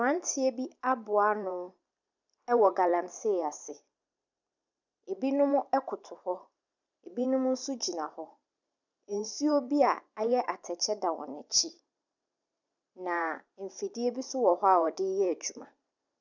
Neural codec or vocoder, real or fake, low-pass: vocoder, 44.1 kHz, 128 mel bands every 512 samples, BigVGAN v2; fake; 7.2 kHz